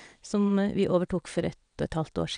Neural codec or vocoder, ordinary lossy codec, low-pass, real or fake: vocoder, 22.05 kHz, 80 mel bands, WaveNeXt; none; 9.9 kHz; fake